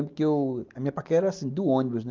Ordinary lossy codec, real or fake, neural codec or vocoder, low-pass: Opus, 24 kbps; real; none; 7.2 kHz